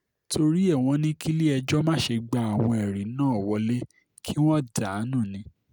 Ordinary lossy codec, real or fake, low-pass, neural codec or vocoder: none; real; none; none